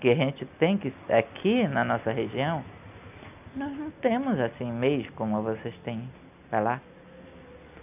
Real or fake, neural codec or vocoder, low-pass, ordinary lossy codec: real; none; 3.6 kHz; none